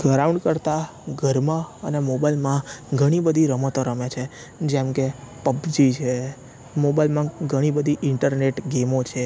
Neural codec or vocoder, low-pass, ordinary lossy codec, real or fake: none; none; none; real